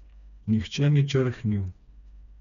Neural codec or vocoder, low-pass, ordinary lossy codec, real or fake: codec, 16 kHz, 2 kbps, FreqCodec, smaller model; 7.2 kHz; none; fake